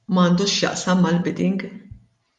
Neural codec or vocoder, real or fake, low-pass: none; real; 10.8 kHz